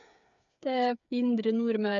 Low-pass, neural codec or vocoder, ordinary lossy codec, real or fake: 7.2 kHz; codec, 16 kHz, 8 kbps, FreqCodec, smaller model; none; fake